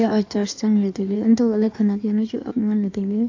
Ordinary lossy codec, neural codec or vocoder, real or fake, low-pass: AAC, 48 kbps; codec, 16 kHz in and 24 kHz out, 1.1 kbps, FireRedTTS-2 codec; fake; 7.2 kHz